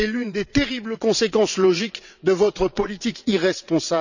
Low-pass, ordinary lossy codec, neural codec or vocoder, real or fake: 7.2 kHz; none; vocoder, 22.05 kHz, 80 mel bands, WaveNeXt; fake